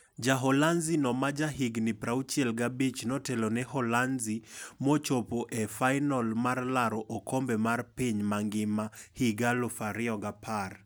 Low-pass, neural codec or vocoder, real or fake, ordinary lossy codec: none; none; real; none